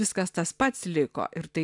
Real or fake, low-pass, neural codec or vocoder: real; 10.8 kHz; none